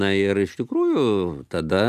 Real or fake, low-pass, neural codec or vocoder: real; 14.4 kHz; none